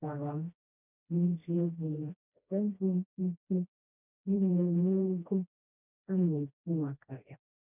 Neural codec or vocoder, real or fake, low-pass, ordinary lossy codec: codec, 16 kHz, 1 kbps, FreqCodec, smaller model; fake; 3.6 kHz; none